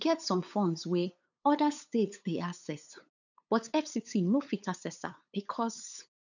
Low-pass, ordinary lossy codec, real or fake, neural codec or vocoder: 7.2 kHz; none; fake; codec, 16 kHz, 8 kbps, FunCodec, trained on LibriTTS, 25 frames a second